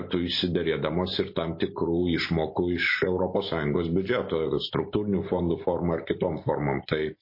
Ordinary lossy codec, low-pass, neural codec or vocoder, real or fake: MP3, 24 kbps; 5.4 kHz; none; real